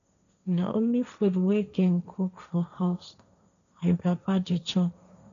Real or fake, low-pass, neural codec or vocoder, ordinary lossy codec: fake; 7.2 kHz; codec, 16 kHz, 1.1 kbps, Voila-Tokenizer; none